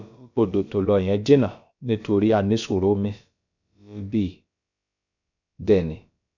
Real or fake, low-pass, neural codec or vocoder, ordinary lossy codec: fake; 7.2 kHz; codec, 16 kHz, about 1 kbps, DyCAST, with the encoder's durations; none